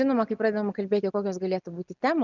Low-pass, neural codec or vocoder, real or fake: 7.2 kHz; none; real